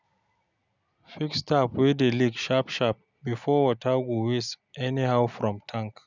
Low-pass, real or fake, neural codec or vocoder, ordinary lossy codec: 7.2 kHz; real; none; none